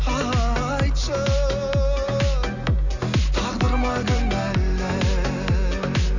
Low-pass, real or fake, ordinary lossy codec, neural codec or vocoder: 7.2 kHz; real; none; none